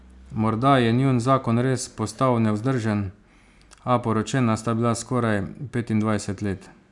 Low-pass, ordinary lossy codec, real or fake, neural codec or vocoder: 10.8 kHz; none; real; none